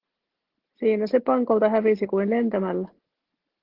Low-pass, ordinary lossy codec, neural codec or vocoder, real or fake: 5.4 kHz; Opus, 16 kbps; none; real